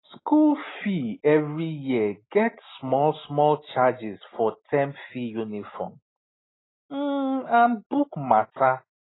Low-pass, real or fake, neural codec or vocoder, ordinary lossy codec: 7.2 kHz; real; none; AAC, 16 kbps